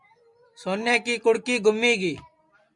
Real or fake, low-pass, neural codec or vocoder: real; 10.8 kHz; none